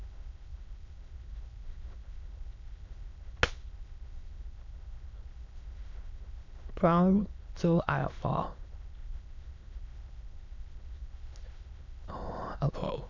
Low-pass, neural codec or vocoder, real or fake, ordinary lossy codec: 7.2 kHz; autoencoder, 22.05 kHz, a latent of 192 numbers a frame, VITS, trained on many speakers; fake; none